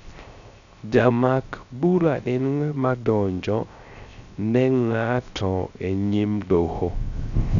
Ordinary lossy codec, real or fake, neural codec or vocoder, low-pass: none; fake; codec, 16 kHz, 0.3 kbps, FocalCodec; 7.2 kHz